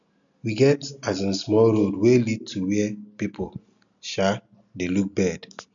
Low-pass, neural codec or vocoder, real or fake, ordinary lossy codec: 7.2 kHz; none; real; none